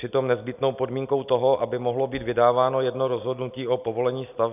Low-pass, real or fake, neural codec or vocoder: 3.6 kHz; real; none